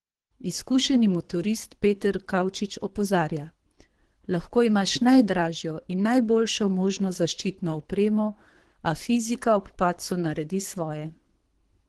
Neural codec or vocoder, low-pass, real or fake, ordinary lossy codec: codec, 24 kHz, 3 kbps, HILCodec; 10.8 kHz; fake; Opus, 16 kbps